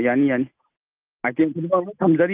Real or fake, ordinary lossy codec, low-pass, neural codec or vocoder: real; Opus, 24 kbps; 3.6 kHz; none